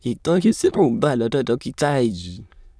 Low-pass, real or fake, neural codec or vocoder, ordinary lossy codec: none; fake; autoencoder, 22.05 kHz, a latent of 192 numbers a frame, VITS, trained on many speakers; none